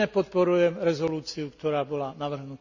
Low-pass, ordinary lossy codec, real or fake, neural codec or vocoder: 7.2 kHz; none; real; none